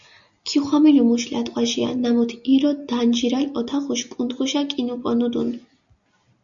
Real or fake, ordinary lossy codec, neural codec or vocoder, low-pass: real; Opus, 64 kbps; none; 7.2 kHz